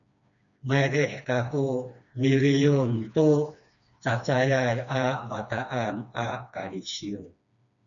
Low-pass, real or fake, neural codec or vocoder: 7.2 kHz; fake; codec, 16 kHz, 2 kbps, FreqCodec, smaller model